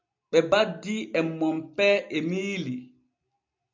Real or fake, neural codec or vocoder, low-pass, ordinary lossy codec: real; none; 7.2 kHz; MP3, 64 kbps